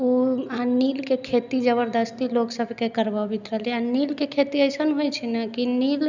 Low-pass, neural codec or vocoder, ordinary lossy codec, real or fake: 7.2 kHz; none; none; real